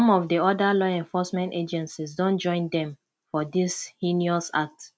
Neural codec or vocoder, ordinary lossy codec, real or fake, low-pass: none; none; real; none